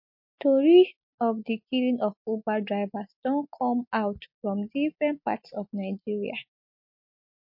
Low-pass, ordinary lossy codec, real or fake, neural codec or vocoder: 5.4 kHz; MP3, 32 kbps; real; none